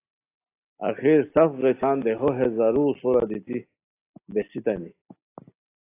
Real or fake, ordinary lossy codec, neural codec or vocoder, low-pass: real; AAC, 24 kbps; none; 3.6 kHz